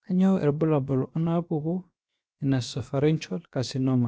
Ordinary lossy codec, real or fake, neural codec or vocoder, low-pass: none; fake; codec, 16 kHz, about 1 kbps, DyCAST, with the encoder's durations; none